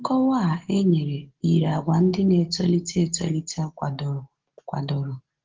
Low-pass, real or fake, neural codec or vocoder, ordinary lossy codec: 7.2 kHz; real; none; Opus, 16 kbps